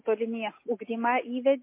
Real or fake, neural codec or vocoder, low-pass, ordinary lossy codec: real; none; 3.6 kHz; MP3, 24 kbps